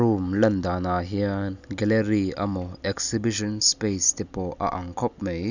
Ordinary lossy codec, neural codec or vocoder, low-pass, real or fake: none; none; 7.2 kHz; real